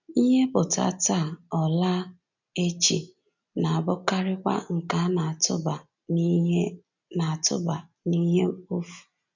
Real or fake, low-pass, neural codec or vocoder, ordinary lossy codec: real; 7.2 kHz; none; none